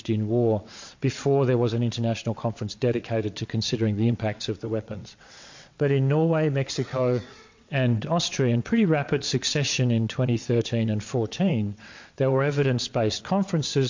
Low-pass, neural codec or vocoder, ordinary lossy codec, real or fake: 7.2 kHz; vocoder, 22.05 kHz, 80 mel bands, WaveNeXt; MP3, 48 kbps; fake